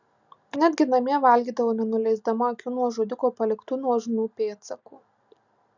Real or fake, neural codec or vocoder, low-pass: real; none; 7.2 kHz